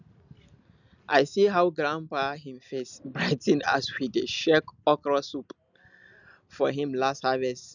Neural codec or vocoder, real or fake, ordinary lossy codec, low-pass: none; real; none; 7.2 kHz